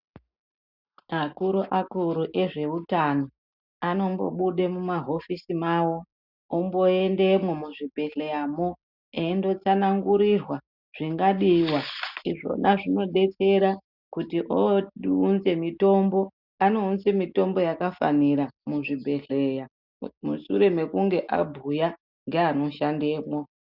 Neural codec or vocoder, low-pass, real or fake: none; 5.4 kHz; real